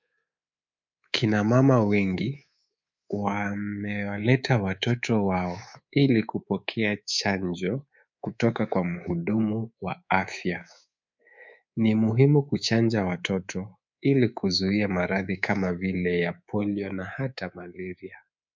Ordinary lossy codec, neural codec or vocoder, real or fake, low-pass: MP3, 64 kbps; codec, 24 kHz, 3.1 kbps, DualCodec; fake; 7.2 kHz